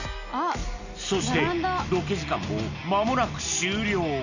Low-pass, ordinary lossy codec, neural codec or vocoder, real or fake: 7.2 kHz; none; none; real